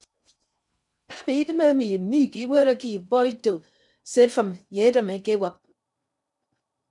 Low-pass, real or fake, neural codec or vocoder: 10.8 kHz; fake; codec, 16 kHz in and 24 kHz out, 0.6 kbps, FocalCodec, streaming, 2048 codes